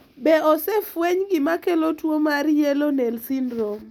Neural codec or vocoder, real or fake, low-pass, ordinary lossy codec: none; real; 19.8 kHz; none